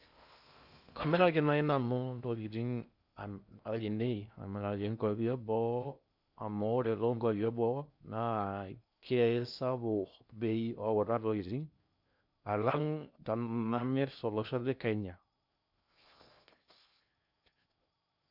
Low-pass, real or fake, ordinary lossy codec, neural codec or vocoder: 5.4 kHz; fake; none; codec, 16 kHz in and 24 kHz out, 0.6 kbps, FocalCodec, streaming, 2048 codes